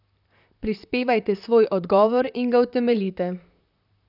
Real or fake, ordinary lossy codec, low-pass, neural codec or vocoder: fake; none; 5.4 kHz; vocoder, 44.1 kHz, 128 mel bands, Pupu-Vocoder